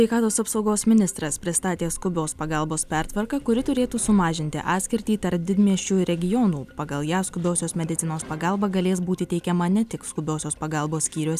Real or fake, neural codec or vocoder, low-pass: real; none; 14.4 kHz